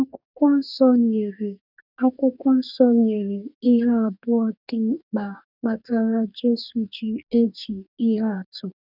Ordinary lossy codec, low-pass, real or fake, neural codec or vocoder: none; 5.4 kHz; fake; codec, 44.1 kHz, 2.6 kbps, DAC